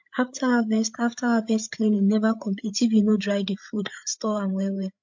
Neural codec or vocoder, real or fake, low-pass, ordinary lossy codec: codec, 16 kHz, 8 kbps, FreqCodec, larger model; fake; 7.2 kHz; MP3, 48 kbps